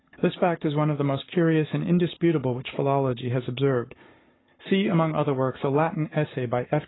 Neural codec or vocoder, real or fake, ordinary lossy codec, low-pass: codec, 44.1 kHz, 7.8 kbps, Pupu-Codec; fake; AAC, 16 kbps; 7.2 kHz